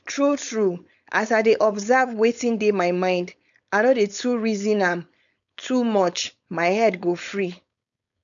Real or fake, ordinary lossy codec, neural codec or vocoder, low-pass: fake; none; codec, 16 kHz, 4.8 kbps, FACodec; 7.2 kHz